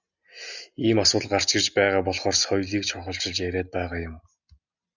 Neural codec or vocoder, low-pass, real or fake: none; 7.2 kHz; real